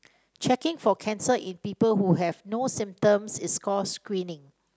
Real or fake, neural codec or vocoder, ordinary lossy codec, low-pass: real; none; none; none